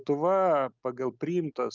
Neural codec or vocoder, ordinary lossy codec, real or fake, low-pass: none; Opus, 24 kbps; real; 7.2 kHz